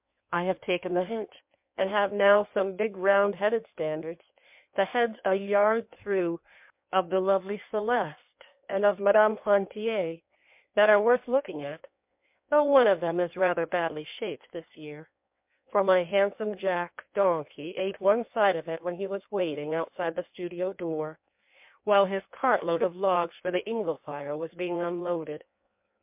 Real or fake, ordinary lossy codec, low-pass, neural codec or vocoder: fake; MP3, 32 kbps; 3.6 kHz; codec, 16 kHz in and 24 kHz out, 1.1 kbps, FireRedTTS-2 codec